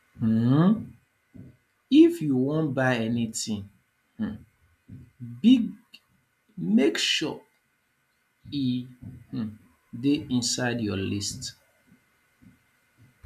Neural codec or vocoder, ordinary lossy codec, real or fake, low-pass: none; none; real; 14.4 kHz